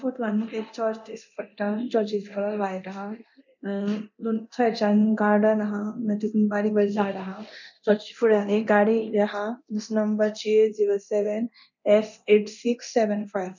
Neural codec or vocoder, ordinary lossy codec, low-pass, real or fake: codec, 24 kHz, 0.9 kbps, DualCodec; none; 7.2 kHz; fake